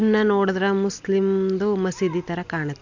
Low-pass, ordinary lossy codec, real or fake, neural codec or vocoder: 7.2 kHz; none; real; none